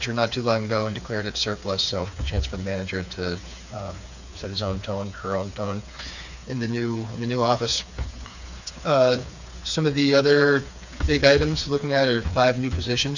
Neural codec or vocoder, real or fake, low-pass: codec, 16 kHz, 4 kbps, FreqCodec, smaller model; fake; 7.2 kHz